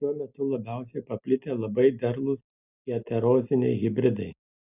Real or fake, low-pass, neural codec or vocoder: real; 3.6 kHz; none